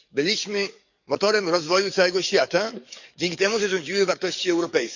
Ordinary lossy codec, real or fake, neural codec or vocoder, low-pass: none; fake; codec, 44.1 kHz, 7.8 kbps, DAC; 7.2 kHz